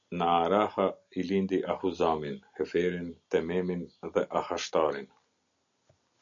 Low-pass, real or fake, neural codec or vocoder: 7.2 kHz; real; none